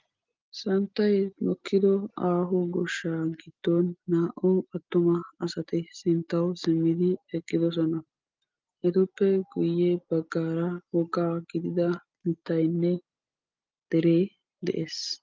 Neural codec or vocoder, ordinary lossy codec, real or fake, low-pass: none; Opus, 24 kbps; real; 7.2 kHz